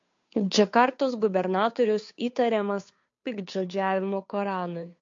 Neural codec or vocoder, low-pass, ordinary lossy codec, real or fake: codec, 16 kHz, 2 kbps, FunCodec, trained on Chinese and English, 25 frames a second; 7.2 kHz; MP3, 48 kbps; fake